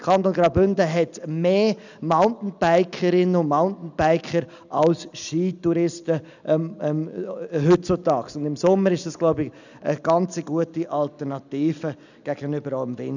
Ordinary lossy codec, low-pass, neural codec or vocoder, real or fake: none; 7.2 kHz; none; real